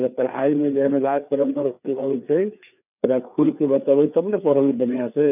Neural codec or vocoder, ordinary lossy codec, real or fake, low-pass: codec, 16 kHz, 4 kbps, FreqCodec, larger model; none; fake; 3.6 kHz